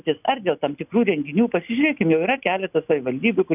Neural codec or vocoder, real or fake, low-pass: none; real; 3.6 kHz